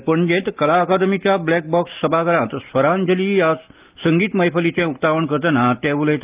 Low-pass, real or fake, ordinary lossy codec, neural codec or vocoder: 3.6 kHz; real; Opus, 32 kbps; none